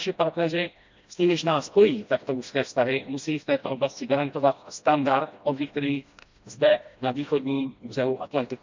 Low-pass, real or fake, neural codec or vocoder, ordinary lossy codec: 7.2 kHz; fake; codec, 16 kHz, 1 kbps, FreqCodec, smaller model; MP3, 64 kbps